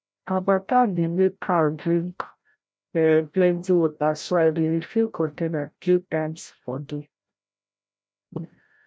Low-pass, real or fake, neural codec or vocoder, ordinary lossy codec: none; fake; codec, 16 kHz, 0.5 kbps, FreqCodec, larger model; none